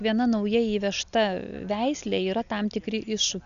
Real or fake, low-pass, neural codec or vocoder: real; 7.2 kHz; none